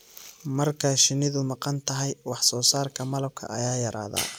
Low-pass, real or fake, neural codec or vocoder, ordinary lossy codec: none; real; none; none